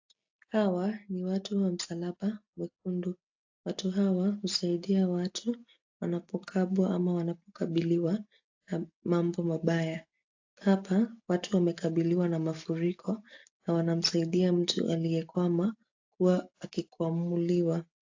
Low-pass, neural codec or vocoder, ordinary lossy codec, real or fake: 7.2 kHz; none; AAC, 48 kbps; real